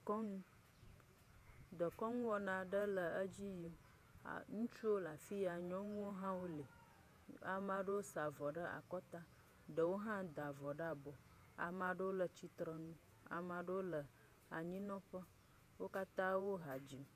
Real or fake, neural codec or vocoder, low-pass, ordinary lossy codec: fake; vocoder, 48 kHz, 128 mel bands, Vocos; 14.4 kHz; AAC, 96 kbps